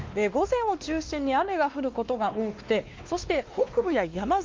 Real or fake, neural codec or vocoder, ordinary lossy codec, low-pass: fake; codec, 16 kHz, 2 kbps, X-Codec, WavLM features, trained on Multilingual LibriSpeech; Opus, 24 kbps; 7.2 kHz